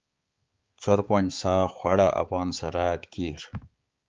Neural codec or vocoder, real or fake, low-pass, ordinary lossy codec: codec, 16 kHz, 4 kbps, X-Codec, HuBERT features, trained on balanced general audio; fake; 7.2 kHz; Opus, 32 kbps